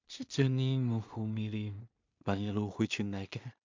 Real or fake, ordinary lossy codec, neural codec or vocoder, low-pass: fake; MP3, 64 kbps; codec, 16 kHz in and 24 kHz out, 0.4 kbps, LongCat-Audio-Codec, two codebook decoder; 7.2 kHz